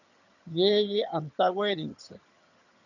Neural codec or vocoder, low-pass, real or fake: vocoder, 22.05 kHz, 80 mel bands, HiFi-GAN; 7.2 kHz; fake